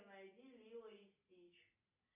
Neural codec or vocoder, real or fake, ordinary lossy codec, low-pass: none; real; AAC, 32 kbps; 3.6 kHz